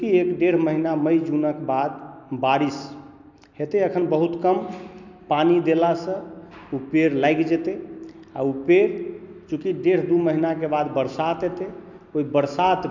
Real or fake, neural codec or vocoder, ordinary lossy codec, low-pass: real; none; none; 7.2 kHz